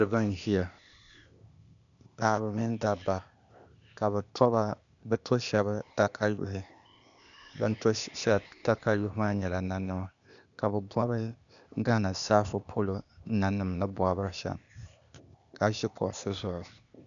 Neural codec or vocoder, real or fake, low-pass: codec, 16 kHz, 0.8 kbps, ZipCodec; fake; 7.2 kHz